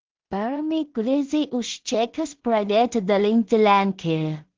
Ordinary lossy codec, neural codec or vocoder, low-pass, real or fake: Opus, 16 kbps; codec, 16 kHz in and 24 kHz out, 0.4 kbps, LongCat-Audio-Codec, two codebook decoder; 7.2 kHz; fake